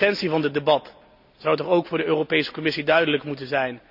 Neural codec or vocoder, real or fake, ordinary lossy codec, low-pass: none; real; none; 5.4 kHz